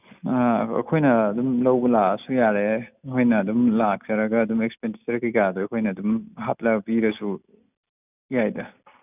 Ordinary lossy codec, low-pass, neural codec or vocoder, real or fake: none; 3.6 kHz; none; real